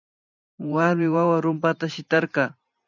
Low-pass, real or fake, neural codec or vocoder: 7.2 kHz; fake; vocoder, 44.1 kHz, 128 mel bands every 512 samples, BigVGAN v2